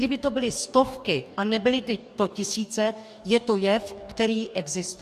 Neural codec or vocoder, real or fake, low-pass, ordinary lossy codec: codec, 44.1 kHz, 2.6 kbps, DAC; fake; 14.4 kHz; AAC, 96 kbps